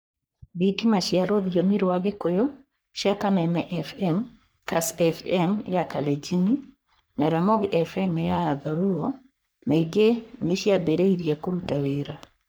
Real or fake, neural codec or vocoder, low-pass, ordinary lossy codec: fake; codec, 44.1 kHz, 3.4 kbps, Pupu-Codec; none; none